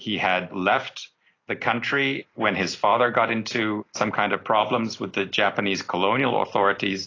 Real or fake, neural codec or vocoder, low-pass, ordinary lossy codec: real; none; 7.2 kHz; AAC, 32 kbps